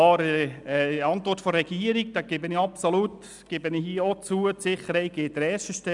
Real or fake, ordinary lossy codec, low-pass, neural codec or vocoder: real; none; 10.8 kHz; none